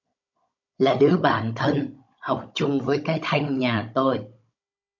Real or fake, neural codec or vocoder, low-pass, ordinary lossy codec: fake; codec, 16 kHz, 16 kbps, FunCodec, trained on Chinese and English, 50 frames a second; 7.2 kHz; MP3, 64 kbps